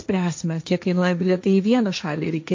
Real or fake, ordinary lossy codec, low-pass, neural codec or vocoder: fake; MP3, 48 kbps; 7.2 kHz; codec, 16 kHz, 1.1 kbps, Voila-Tokenizer